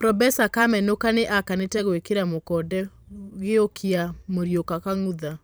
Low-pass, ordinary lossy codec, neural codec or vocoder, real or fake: none; none; vocoder, 44.1 kHz, 128 mel bands every 512 samples, BigVGAN v2; fake